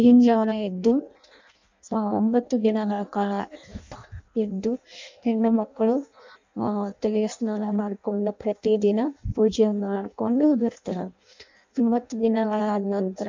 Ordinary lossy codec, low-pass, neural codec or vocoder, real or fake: MP3, 64 kbps; 7.2 kHz; codec, 16 kHz in and 24 kHz out, 0.6 kbps, FireRedTTS-2 codec; fake